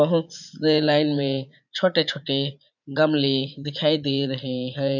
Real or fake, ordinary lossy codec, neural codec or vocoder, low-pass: fake; none; vocoder, 44.1 kHz, 80 mel bands, Vocos; 7.2 kHz